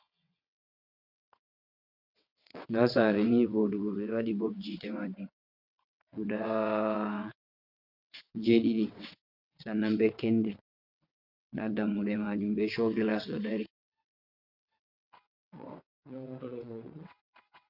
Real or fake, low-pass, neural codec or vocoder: fake; 5.4 kHz; vocoder, 22.05 kHz, 80 mel bands, WaveNeXt